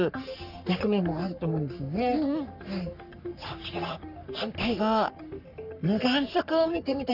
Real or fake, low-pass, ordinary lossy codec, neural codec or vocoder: fake; 5.4 kHz; none; codec, 44.1 kHz, 3.4 kbps, Pupu-Codec